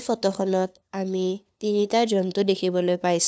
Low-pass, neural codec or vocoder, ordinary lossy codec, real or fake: none; codec, 16 kHz, 2 kbps, FunCodec, trained on LibriTTS, 25 frames a second; none; fake